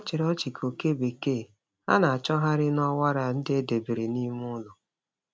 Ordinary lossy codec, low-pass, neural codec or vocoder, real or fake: none; none; none; real